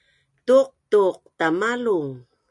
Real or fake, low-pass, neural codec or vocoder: real; 10.8 kHz; none